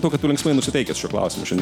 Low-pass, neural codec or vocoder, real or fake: 19.8 kHz; none; real